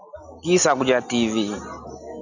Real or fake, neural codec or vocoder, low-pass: real; none; 7.2 kHz